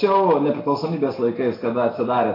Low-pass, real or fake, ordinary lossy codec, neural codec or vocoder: 5.4 kHz; real; AAC, 24 kbps; none